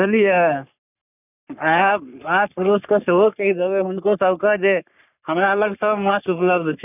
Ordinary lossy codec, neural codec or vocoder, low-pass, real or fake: none; codec, 44.1 kHz, 3.4 kbps, Pupu-Codec; 3.6 kHz; fake